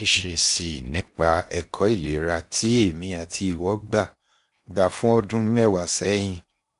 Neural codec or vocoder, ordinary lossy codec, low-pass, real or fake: codec, 16 kHz in and 24 kHz out, 0.8 kbps, FocalCodec, streaming, 65536 codes; MP3, 64 kbps; 10.8 kHz; fake